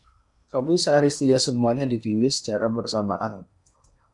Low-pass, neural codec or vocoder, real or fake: 10.8 kHz; codec, 16 kHz in and 24 kHz out, 0.8 kbps, FocalCodec, streaming, 65536 codes; fake